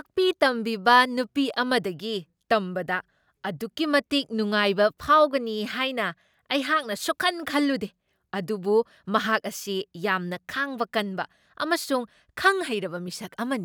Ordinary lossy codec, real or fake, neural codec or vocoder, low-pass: none; real; none; none